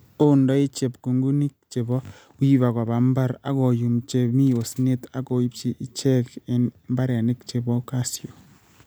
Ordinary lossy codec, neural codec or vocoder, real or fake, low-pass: none; none; real; none